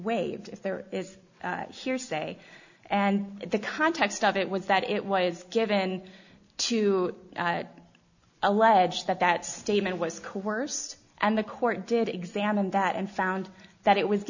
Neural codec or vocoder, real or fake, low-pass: none; real; 7.2 kHz